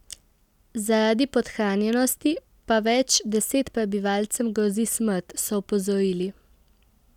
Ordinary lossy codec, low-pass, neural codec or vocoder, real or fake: none; 19.8 kHz; none; real